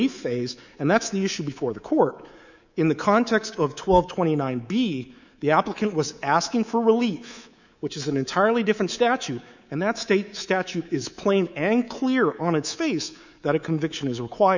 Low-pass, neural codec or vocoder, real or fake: 7.2 kHz; codec, 24 kHz, 3.1 kbps, DualCodec; fake